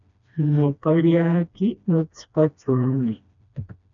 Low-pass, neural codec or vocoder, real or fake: 7.2 kHz; codec, 16 kHz, 1 kbps, FreqCodec, smaller model; fake